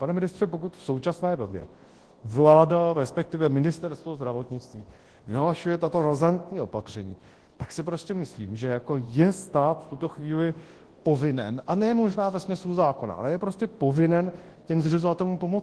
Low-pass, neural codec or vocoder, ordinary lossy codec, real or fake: 10.8 kHz; codec, 24 kHz, 0.9 kbps, WavTokenizer, large speech release; Opus, 16 kbps; fake